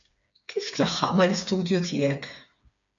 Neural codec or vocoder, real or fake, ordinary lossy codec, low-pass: codec, 16 kHz, 4 kbps, FreqCodec, smaller model; fake; MP3, 64 kbps; 7.2 kHz